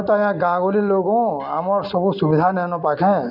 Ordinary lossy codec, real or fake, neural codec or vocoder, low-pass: none; real; none; 5.4 kHz